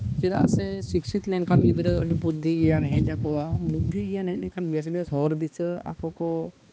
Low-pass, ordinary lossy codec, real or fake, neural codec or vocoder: none; none; fake; codec, 16 kHz, 2 kbps, X-Codec, HuBERT features, trained on balanced general audio